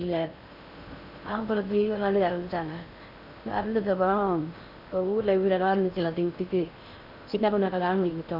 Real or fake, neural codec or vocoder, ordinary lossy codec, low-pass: fake; codec, 16 kHz in and 24 kHz out, 0.6 kbps, FocalCodec, streaming, 4096 codes; none; 5.4 kHz